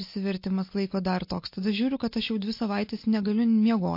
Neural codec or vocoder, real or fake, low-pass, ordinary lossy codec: none; real; 5.4 kHz; MP3, 32 kbps